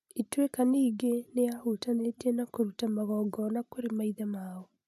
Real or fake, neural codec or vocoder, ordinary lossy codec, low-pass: real; none; none; 14.4 kHz